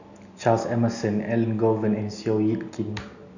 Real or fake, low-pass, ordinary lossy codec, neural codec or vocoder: real; 7.2 kHz; none; none